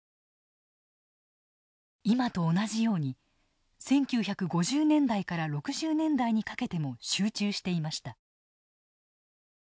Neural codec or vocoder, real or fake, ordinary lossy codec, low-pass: none; real; none; none